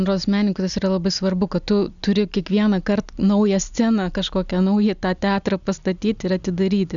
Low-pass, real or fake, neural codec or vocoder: 7.2 kHz; real; none